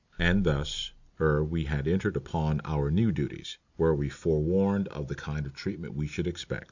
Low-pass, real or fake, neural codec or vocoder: 7.2 kHz; real; none